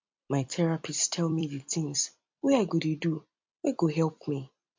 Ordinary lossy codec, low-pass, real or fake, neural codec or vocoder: MP3, 48 kbps; 7.2 kHz; fake; vocoder, 44.1 kHz, 128 mel bands every 256 samples, BigVGAN v2